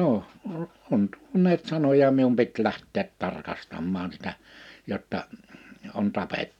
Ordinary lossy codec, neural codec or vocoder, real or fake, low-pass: none; none; real; 19.8 kHz